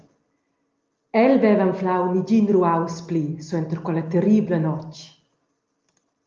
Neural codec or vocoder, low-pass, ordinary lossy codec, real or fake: none; 7.2 kHz; Opus, 24 kbps; real